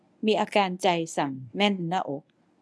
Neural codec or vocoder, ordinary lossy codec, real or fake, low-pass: codec, 24 kHz, 0.9 kbps, WavTokenizer, medium speech release version 1; none; fake; none